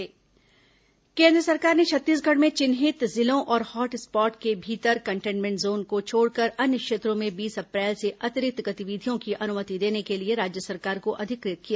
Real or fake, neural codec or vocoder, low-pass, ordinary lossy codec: real; none; none; none